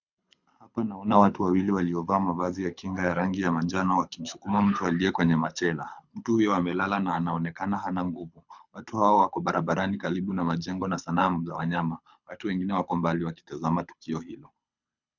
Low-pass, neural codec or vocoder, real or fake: 7.2 kHz; codec, 24 kHz, 6 kbps, HILCodec; fake